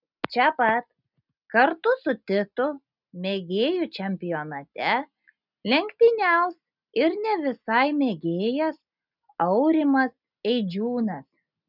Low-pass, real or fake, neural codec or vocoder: 5.4 kHz; real; none